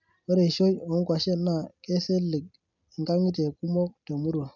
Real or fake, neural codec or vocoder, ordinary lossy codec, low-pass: real; none; none; 7.2 kHz